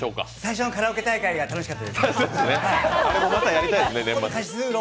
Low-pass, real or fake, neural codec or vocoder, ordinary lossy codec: none; real; none; none